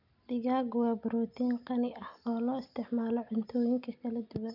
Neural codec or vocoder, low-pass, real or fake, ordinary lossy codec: none; 5.4 kHz; real; none